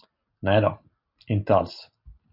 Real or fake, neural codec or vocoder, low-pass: real; none; 5.4 kHz